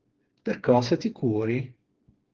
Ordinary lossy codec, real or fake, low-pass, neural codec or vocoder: Opus, 16 kbps; fake; 7.2 kHz; codec, 16 kHz, 4 kbps, FreqCodec, smaller model